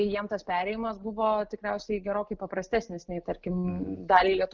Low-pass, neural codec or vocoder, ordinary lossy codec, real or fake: 7.2 kHz; none; Opus, 24 kbps; real